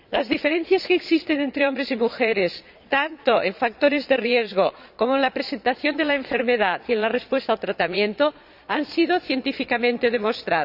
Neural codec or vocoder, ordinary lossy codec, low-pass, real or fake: vocoder, 22.05 kHz, 80 mel bands, Vocos; none; 5.4 kHz; fake